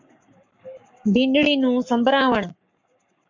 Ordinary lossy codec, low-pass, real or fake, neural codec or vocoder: MP3, 48 kbps; 7.2 kHz; fake; codec, 44.1 kHz, 7.8 kbps, Pupu-Codec